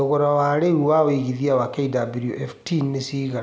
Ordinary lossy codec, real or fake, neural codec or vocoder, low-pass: none; real; none; none